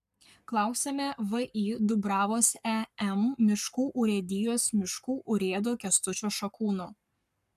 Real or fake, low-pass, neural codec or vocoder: fake; 14.4 kHz; codec, 44.1 kHz, 7.8 kbps, Pupu-Codec